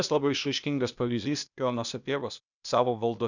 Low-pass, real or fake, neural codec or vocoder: 7.2 kHz; fake; codec, 16 kHz, 0.8 kbps, ZipCodec